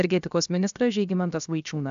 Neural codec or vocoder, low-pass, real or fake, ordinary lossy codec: codec, 16 kHz, 0.7 kbps, FocalCodec; 7.2 kHz; fake; AAC, 96 kbps